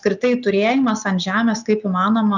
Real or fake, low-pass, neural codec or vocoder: real; 7.2 kHz; none